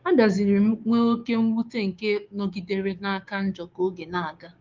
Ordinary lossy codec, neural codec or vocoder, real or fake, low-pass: Opus, 32 kbps; codec, 16 kHz, 6 kbps, DAC; fake; 7.2 kHz